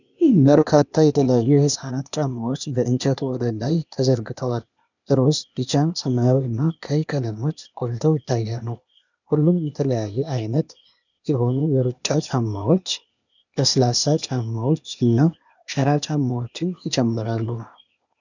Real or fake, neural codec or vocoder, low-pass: fake; codec, 16 kHz, 0.8 kbps, ZipCodec; 7.2 kHz